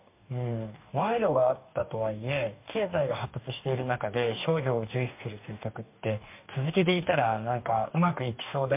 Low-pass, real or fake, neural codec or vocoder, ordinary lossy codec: 3.6 kHz; fake; codec, 44.1 kHz, 2.6 kbps, DAC; MP3, 32 kbps